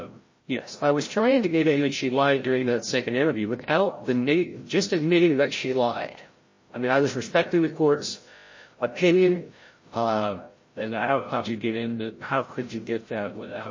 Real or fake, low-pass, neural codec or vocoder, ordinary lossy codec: fake; 7.2 kHz; codec, 16 kHz, 0.5 kbps, FreqCodec, larger model; MP3, 32 kbps